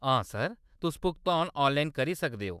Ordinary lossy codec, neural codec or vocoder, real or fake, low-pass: none; autoencoder, 48 kHz, 128 numbers a frame, DAC-VAE, trained on Japanese speech; fake; 14.4 kHz